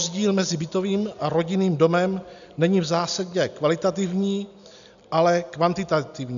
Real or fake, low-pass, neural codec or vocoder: real; 7.2 kHz; none